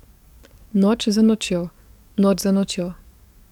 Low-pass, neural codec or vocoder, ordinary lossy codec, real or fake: 19.8 kHz; codec, 44.1 kHz, 7.8 kbps, DAC; none; fake